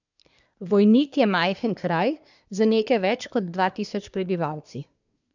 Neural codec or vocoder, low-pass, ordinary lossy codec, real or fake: codec, 24 kHz, 1 kbps, SNAC; 7.2 kHz; none; fake